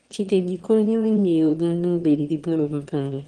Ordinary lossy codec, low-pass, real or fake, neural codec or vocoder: Opus, 16 kbps; 9.9 kHz; fake; autoencoder, 22.05 kHz, a latent of 192 numbers a frame, VITS, trained on one speaker